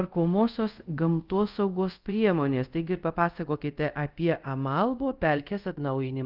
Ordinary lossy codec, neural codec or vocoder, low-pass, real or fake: Opus, 32 kbps; codec, 24 kHz, 0.5 kbps, DualCodec; 5.4 kHz; fake